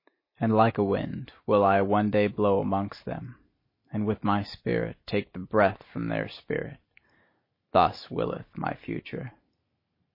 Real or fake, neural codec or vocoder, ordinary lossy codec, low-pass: real; none; MP3, 24 kbps; 5.4 kHz